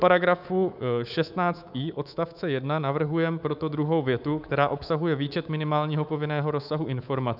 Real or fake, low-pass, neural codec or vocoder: fake; 5.4 kHz; codec, 24 kHz, 3.1 kbps, DualCodec